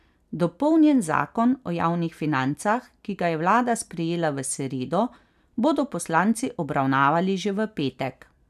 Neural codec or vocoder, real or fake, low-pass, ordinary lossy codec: none; real; 14.4 kHz; none